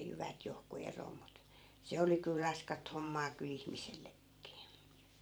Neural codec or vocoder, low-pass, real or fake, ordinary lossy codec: vocoder, 44.1 kHz, 128 mel bands every 512 samples, BigVGAN v2; none; fake; none